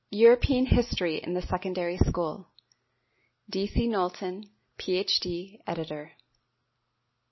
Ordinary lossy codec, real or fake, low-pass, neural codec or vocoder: MP3, 24 kbps; real; 7.2 kHz; none